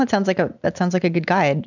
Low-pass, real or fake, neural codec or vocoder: 7.2 kHz; real; none